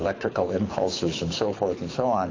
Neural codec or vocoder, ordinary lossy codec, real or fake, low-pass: codec, 24 kHz, 6 kbps, HILCodec; AAC, 32 kbps; fake; 7.2 kHz